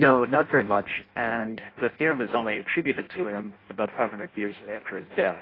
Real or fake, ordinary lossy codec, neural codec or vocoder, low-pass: fake; AAC, 24 kbps; codec, 16 kHz in and 24 kHz out, 0.6 kbps, FireRedTTS-2 codec; 5.4 kHz